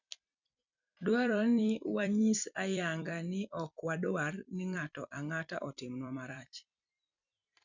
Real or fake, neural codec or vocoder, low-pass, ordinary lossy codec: fake; vocoder, 44.1 kHz, 128 mel bands every 256 samples, BigVGAN v2; 7.2 kHz; none